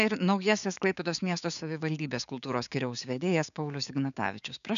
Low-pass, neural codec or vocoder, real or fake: 7.2 kHz; codec, 16 kHz, 6 kbps, DAC; fake